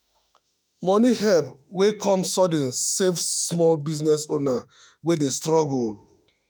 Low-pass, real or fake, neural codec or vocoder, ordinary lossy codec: none; fake; autoencoder, 48 kHz, 32 numbers a frame, DAC-VAE, trained on Japanese speech; none